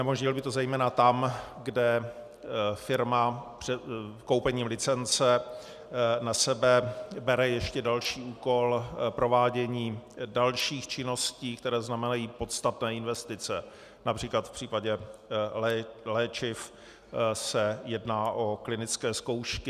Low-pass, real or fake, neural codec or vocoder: 14.4 kHz; real; none